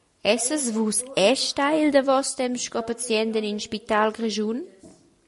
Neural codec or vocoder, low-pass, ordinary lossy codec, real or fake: none; 14.4 kHz; MP3, 48 kbps; real